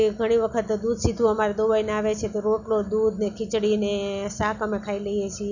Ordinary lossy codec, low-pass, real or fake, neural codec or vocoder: none; 7.2 kHz; real; none